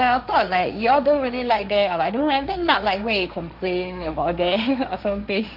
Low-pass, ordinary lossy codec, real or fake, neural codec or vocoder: 5.4 kHz; none; fake; codec, 16 kHz, 1.1 kbps, Voila-Tokenizer